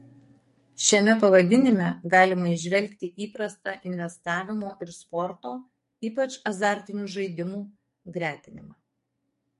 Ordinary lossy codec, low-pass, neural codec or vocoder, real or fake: MP3, 48 kbps; 14.4 kHz; codec, 44.1 kHz, 2.6 kbps, SNAC; fake